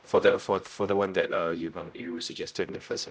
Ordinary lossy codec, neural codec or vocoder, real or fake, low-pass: none; codec, 16 kHz, 0.5 kbps, X-Codec, HuBERT features, trained on general audio; fake; none